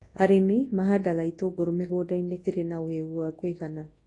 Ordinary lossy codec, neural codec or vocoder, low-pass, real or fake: AAC, 32 kbps; codec, 24 kHz, 0.9 kbps, WavTokenizer, large speech release; 10.8 kHz; fake